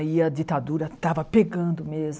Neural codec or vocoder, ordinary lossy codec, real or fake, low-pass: none; none; real; none